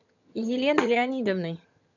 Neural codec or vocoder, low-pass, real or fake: vocoder, 22.05 kHz, 80 mel bands, HiFi-GAN; 7.2 kHz; fake